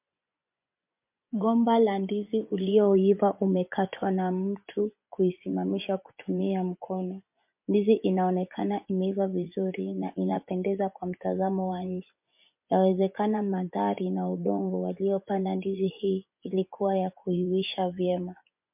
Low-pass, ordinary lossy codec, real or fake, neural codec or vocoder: 3.6 kHz; MP3, 32 kbps; fake; vocoder, 24 kHz, 100 mel bands, Vocos